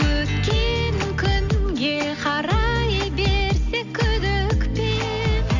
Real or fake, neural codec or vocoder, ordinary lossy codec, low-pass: real; none; none; 7.2 kHz